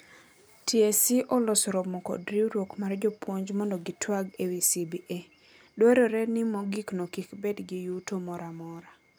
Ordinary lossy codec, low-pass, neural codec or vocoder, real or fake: none; none; none; real